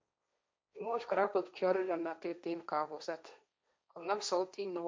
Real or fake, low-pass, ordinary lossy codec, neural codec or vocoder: fake; none; none; codec, 16 kHz, 1.1 kbps, Voila-Tokenizer